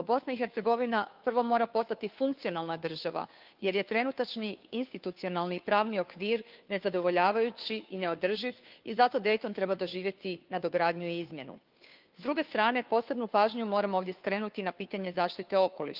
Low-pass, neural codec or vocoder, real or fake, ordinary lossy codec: 5.4 kHz; codec, 16 kHz, 2 kbps, FunCodec, trained on Chinese and English, 25 frames a second; fake; Opus, 24 kbps